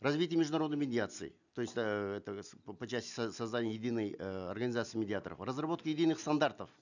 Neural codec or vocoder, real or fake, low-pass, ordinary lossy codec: none; real; 7.2 kHz; none